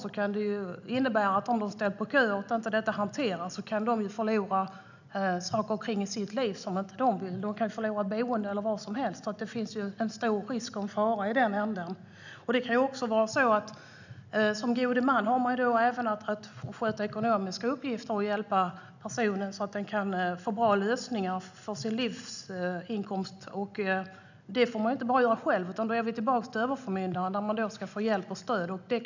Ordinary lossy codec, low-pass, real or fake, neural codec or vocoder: none; 7.2 kHz; real; none